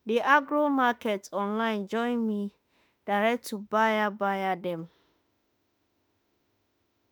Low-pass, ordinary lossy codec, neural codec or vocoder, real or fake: none; none; autoencoder, 48 kHz, 32 numbers a frame, DAC-VAE, trained on Japanese speech; fake